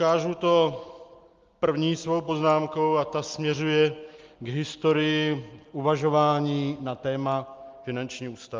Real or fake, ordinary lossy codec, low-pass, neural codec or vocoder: real; Opus, 32 kbps; 7.2 kHz; none